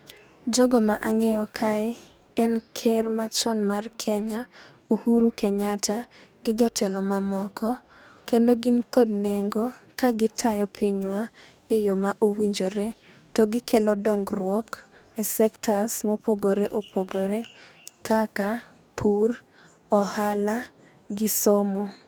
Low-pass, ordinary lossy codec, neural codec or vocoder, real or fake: none; none; codec, 44.1 kHz, 2.6 kbps, DAC; fake